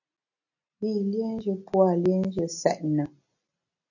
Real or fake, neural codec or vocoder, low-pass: real; none; 7.2 kHz